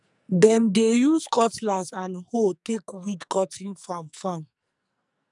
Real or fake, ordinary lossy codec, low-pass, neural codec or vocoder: fake; none; 10.8 kHz; codec, 44.1 kHz, 2.6 kbps, SNAC